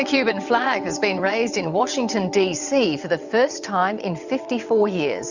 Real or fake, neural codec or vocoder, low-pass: fake; vocoder, 22.05 kHz, 80 mel bands, WaveNeXt; 7.2 kHz